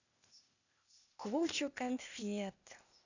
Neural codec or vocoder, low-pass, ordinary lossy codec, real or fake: codec, 16 kHz, 0.8 kbps, ZipCodec; 7.2 kHz; none; fake